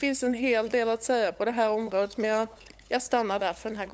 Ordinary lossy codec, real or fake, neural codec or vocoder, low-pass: none; fake; codec, 16 kHz, 8 kbps, FunCodec, trained on LibriTTS, 25 frames a second; none